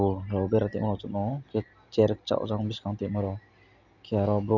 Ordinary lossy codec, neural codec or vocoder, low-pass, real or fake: none; none; 7.2 kHz; real